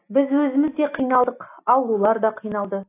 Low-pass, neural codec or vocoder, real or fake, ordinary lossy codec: 3.6 kHz; none; real; AAC, 24 kbps